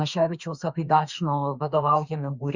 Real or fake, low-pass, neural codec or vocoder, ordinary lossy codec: fake; 7.2 kHz; autoencoder, 48 kHz, 32 numbers a frame, DAC-VAE, trained on Japanese speech; Opus, 64 kbps